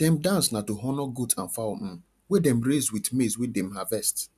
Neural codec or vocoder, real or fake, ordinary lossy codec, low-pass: none; real; none; 14.4 kHz